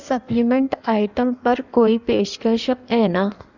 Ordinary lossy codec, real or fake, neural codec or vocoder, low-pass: none; fake; codec, 16 kHz in and 24 kHz out, 1.1 kbps, FireRedTTS-2 codec; 7.2 kHz